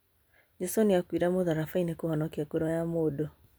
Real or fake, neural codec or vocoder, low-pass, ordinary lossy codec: real; none; none; none